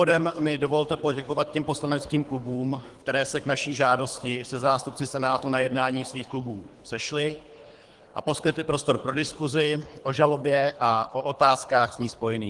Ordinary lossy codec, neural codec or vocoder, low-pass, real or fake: Opus, 24 kbps; codec, 24 kHz, 3 kbps, HILCodec; 10.8 kHz; fake